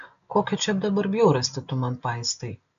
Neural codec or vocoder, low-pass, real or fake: none; 7.2 kHz; real